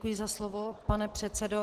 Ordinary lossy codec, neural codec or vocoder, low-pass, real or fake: Opus, 16 kbps; none; 14.4 kHz; real